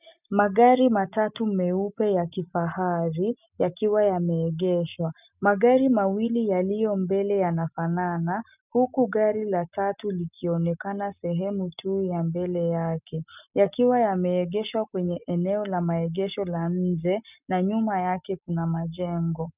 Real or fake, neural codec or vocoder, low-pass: real; none; 3.6 kHz